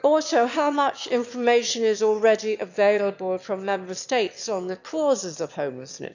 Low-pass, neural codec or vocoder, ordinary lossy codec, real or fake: 7.2 kHz; autoencoder, 22.05 kHz, a latent of 192 numbers a frame, VITS, trained on one speaker; none; fake